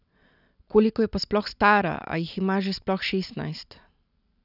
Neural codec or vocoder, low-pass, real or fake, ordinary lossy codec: none; 5.4 kHz; real; none